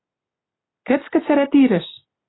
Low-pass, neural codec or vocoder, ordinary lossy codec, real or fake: 7.2 kHz; none; AAC, 16 kbps; real